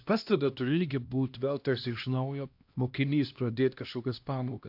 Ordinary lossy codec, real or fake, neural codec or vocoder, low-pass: AAC, 48 kbps; fake; codec, 16 kHz, 1 kbps, X-Codec, HuBERT features, trained on LibriSpeech; 5.4 kHz